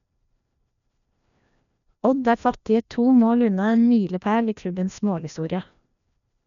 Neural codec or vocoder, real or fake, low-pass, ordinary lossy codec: codec, 16 kHz, 1 kbps, FreqCodec, larger model; fake; 7.2 kHz; none